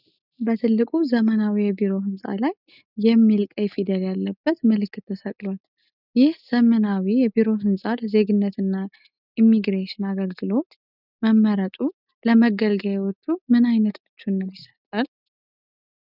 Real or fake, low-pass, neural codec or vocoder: real; 5.4 kHz; none